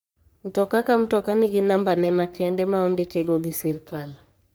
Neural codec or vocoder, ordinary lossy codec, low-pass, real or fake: codec, 44.1 kHz, 3.4 kbps, Pupu-Codec; none; none; fake